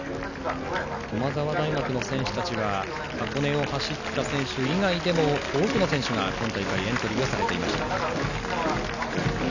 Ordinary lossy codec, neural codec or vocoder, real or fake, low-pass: none; none; real; 7.2 kHz